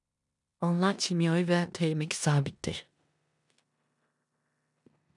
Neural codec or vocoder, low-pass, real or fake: codec, 16 kHz in and 24 kHz out, 0.9 kbps, LongCat-Audio-Codec, four codebook decoder; 10.8 kHz; fake